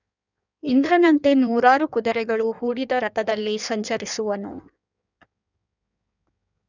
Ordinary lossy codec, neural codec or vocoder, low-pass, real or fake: none; codec, 16 kHz in and 24 kHz out, 1.1 kbps, FireRedTTS-2 codec; 7.2 kHz; fake